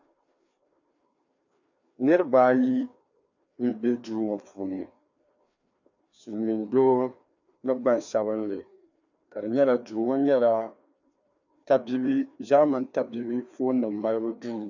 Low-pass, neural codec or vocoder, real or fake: 7.2 kHz; codec, 16 kHz, 2 kbps, FreqCodec, larger model; fake